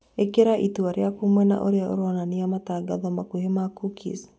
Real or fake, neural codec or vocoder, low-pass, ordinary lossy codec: real; none; none; none